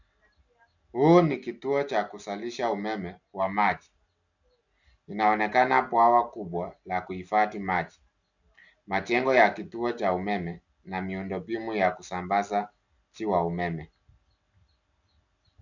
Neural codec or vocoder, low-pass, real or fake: none; 7.2 kHz; real